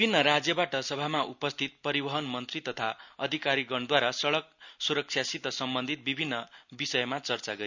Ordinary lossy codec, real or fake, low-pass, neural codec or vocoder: none; real; 7.2 kHz; none